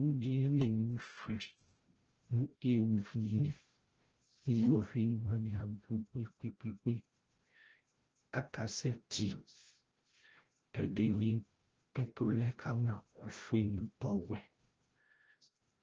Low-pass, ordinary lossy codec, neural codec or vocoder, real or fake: 7.2 kHz; Opus, 16 kbps; codec, 16 kHz, 0.5 kbps, FreqCodec, larger model; fake